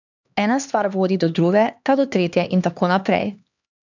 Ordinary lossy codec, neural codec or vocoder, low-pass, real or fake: none; codec, 16 kHz, 2 kbps, X-Codec, HuBERT features, trained on LibriSpeech; 7.2 kHz; fake